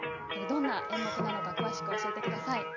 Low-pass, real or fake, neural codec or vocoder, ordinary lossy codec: 7.2 kHz; real; none; none